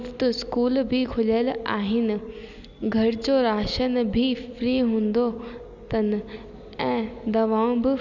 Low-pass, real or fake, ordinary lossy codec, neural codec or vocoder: 7.2 kHz; real; none; none